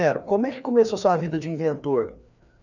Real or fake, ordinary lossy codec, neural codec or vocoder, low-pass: fake; none; codec, 16 kHz, 2 kbps, FreqCodec, larger model; 7.2 kHz